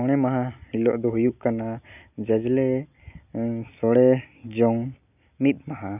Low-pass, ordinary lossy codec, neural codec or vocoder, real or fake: 3.6 kHz; none; none; real